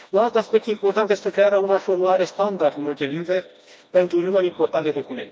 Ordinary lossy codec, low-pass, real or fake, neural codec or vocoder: none; none; fake; codec, 16 kHz, 1 kbps, FreqCodec, smaller model